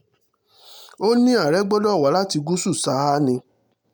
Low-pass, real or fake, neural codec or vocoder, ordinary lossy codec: none; real; none; none